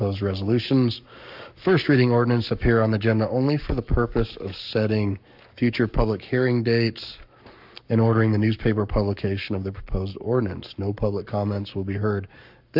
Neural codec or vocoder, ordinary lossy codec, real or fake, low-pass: codec, 44.1 kHz, 7.8 kbps, Pupu-Codec; MP3, 48 kbps; fake; 5.4 kHz